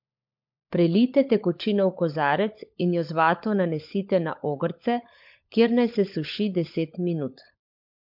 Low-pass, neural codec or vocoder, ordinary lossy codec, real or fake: 5.4 kHz; codec, 16 kHz, 16 kbps, FunCodec, trained on LibriTTS, 50 frames a second; MP3, 48 kbps; fake